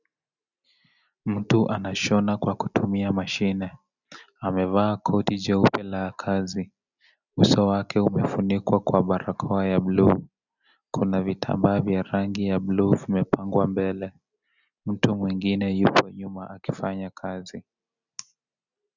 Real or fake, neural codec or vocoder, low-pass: real; none; 7.2 kHz